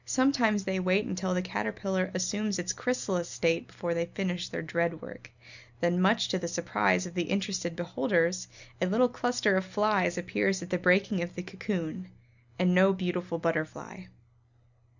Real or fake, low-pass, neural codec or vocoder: real; 7.2 kHz; none